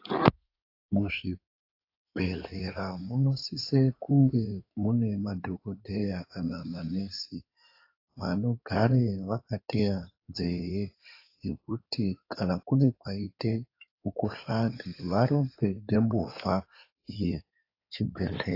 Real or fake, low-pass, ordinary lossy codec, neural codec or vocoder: fake; 5.4 kHz; AAC, 32 kbps; codec, 16 kHz in and 24 kHz out, 2.2 kbps, FireRedTTS-2 codec